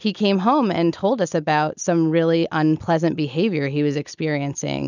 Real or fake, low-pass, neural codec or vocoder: real; 7.2 kHz; none